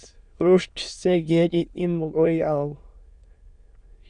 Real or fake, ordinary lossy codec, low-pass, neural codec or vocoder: fake; Opus, 64 kbps; 9.9 kHz; autoencoder, 22.05 kHz, a latent of 192 numbers a frame, VITS, trained on many speakers